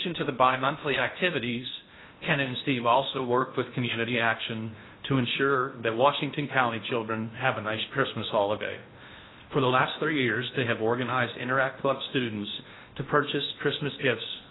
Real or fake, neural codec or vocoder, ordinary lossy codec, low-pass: fake; codec, 16 kHz in and 24 kHz out, 0.6 kbps, FocalCodec, streaming, 4096 codes; AAC, 16 kbps; 7.2 kHz